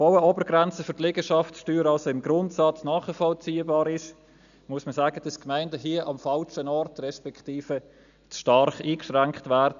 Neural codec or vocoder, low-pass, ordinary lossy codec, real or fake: none; 7.2 kHz; none; real